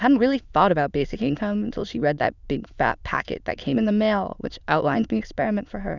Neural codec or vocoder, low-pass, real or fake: autoencoder, 22.05 kHz, a latent of 192 numbers a frame, VITS, trained on many speakers; 7.2 kHz; fake